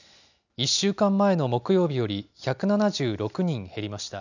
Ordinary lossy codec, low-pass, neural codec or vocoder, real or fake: none; 7.2 kHz; none; real